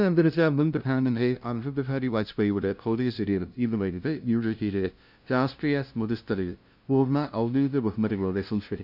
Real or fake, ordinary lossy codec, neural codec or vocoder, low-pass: fake; none; codec, 16 kHz, 0.5 kbps, FunCodec, trained on LibriTTS, 25 frames a second; 5.4 kHz